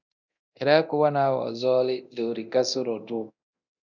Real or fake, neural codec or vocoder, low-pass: fake; codec, 24 kHz, 0.9 kbps, DualCodec; 7.2 kHz